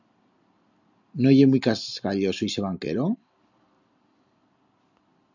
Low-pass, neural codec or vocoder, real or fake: 7.2 kHz; none; real